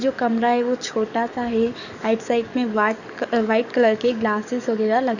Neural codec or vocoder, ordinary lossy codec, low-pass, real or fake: codec, 44.1 kHz, 7.8 kbps, DAC; none; 7.2 kHz; fake